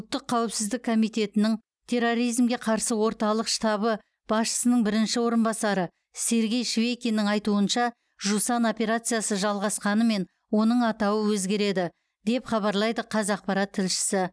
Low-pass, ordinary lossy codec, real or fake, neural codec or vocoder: none; none; real; none